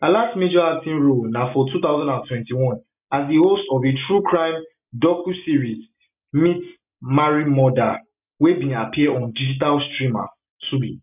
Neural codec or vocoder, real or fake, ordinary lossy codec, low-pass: none; real; none; 3.6 kHz